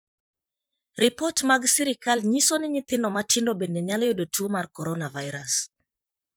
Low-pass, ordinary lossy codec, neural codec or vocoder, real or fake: none; none; vocoder, 44.1 kHz, 128 mel bands, Pupu-Vocoder; fake